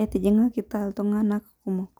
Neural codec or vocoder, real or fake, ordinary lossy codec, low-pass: none; real; none; none